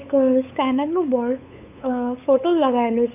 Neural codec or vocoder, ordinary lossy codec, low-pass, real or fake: codec, 16 kHz, 8 kbps, FunCodec, trained on LibriTTS, 25 frames a second; none; 3.6 kHz; fake